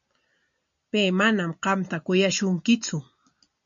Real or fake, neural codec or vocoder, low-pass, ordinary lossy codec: real; none; 7.2 kHz; MP3, 64 kbps